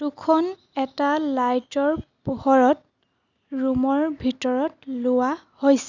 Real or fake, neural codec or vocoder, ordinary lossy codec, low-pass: real; none; none; 7.2 kHz